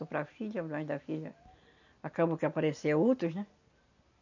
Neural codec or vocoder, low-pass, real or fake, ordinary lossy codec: none; 7.2 kHz; real; none